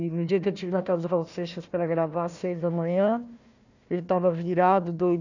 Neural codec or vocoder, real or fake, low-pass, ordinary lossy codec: codec, 16 kHz, 1 kbps, FunCodec, trained on Chinese and English, 50 frames a second; fake; 7.2 kHz; none